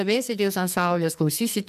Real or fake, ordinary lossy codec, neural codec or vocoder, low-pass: fake; MP3, 96 kbps; codec, 44.1 kHz, 2.6 kbps, SNAC; 14.4 kHz